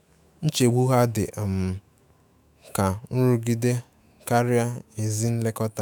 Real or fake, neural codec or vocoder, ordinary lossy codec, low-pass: fake; autoencoder, 48 kHz, 128 numbers a frame, DAC-VAE, trained on Japanese speech; none; none